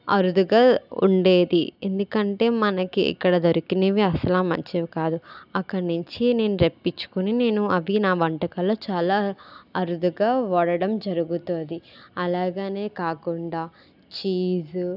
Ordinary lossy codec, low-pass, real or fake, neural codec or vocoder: none; 5.4 kHz; real; none